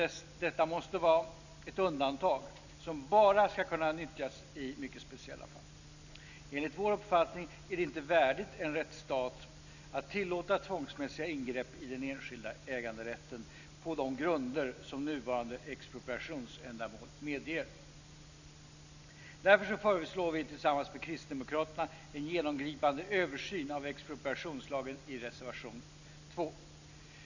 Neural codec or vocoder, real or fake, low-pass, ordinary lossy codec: none; real; 7.2 kHz; none